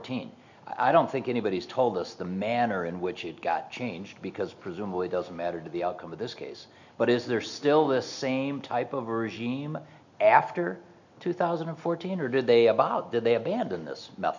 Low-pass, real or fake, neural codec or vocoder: 7.2 kHz; real; none